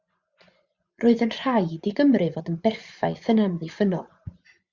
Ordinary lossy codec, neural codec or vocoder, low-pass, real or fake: Opus, 64 kbps; vocoder, 24 kHz, 100 mel bands, Vocos; 7.2 kHz; fake